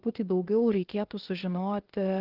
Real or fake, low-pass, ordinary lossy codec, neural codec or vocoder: fake; 5.4 kHz; Opus, 16 kbps; codec, 16 kHz, 0.8 kbps, ZipCodec